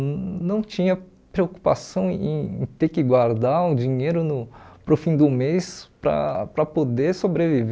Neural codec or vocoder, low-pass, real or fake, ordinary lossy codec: none; none; real; none